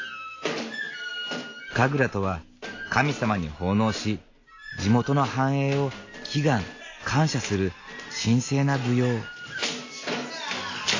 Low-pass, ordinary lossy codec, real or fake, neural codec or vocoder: 7.2 kHz; AAC, 32 kbps; real; none